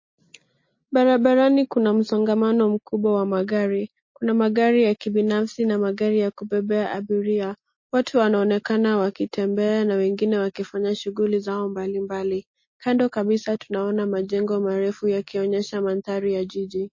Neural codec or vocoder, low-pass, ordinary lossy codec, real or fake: none; 7.2 kHz; MP3, 32 kbps; real